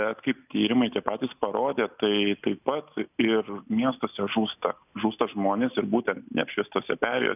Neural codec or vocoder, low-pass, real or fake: none; 3.6 kHz; real